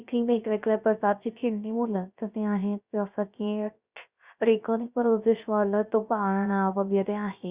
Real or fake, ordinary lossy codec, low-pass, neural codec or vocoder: fake; Opus, 64 kbps; 3.6 kHz; codec, 16 kHz, 0.3 kbps, FocalCodec